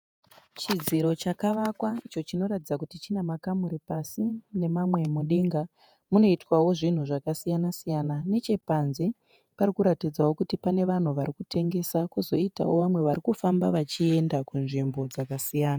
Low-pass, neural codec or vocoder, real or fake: 19.8 kHz; vocoder, 48 kHz, 128 mel bands, Vocos; fake